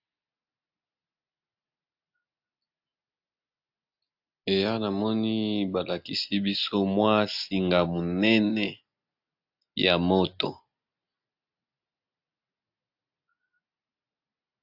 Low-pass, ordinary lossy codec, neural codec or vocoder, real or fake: 5.4 kHz; AAC, 48 kbps; none; real